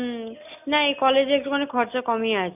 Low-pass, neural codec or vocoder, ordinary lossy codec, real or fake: 3.6 kHz; none; none; real